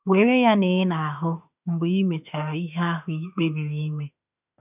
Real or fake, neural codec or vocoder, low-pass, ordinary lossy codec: fake; autoencoder, 48 kHz, 32 numbers a frame, DAC-VAE, trained on Japanese speech; 3.6 kHz; none